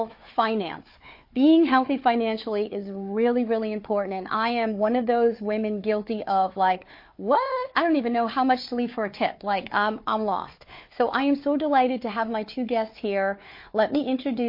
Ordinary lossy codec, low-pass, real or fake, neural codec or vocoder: MP3, 32 kbps; 5.4 kHz; fake; codec, 16 kHz, 4 kbps, FunCodec, trained on Chinese and English, 50 frames a second